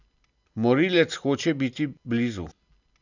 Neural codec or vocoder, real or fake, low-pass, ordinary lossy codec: none; real; 7.2 kHz; none